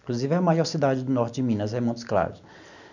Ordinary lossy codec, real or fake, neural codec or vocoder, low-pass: none; real; none; 7.2 kHz